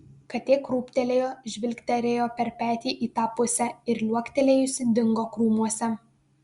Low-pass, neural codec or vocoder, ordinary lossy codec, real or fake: 10.8 kHz; none; Opus, 64 kbps; real